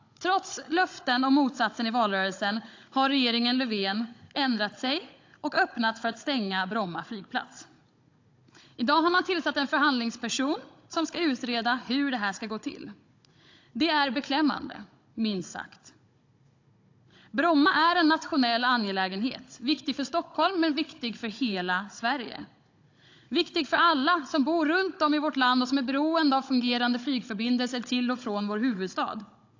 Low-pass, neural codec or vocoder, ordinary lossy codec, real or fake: 7.2 kHz; codec, 16 kHz, 16 kbps, FunCodec, trained on Chinese and English, 50 frames a second; AAC, 48 kbps; fake